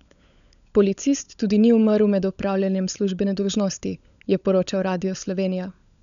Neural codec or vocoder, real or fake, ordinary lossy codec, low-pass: codec, 16 kHz, 16 kbps, FunCodec, trained on LibriTTS, 50 frames a second; fake; none; 7.2 kHz